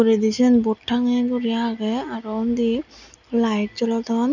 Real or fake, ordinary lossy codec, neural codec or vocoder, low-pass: real; none; none; 7.2 kHz